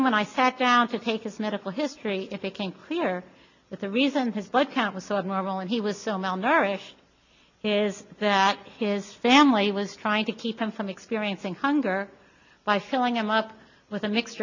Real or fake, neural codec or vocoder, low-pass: real; none; 7.2 kHz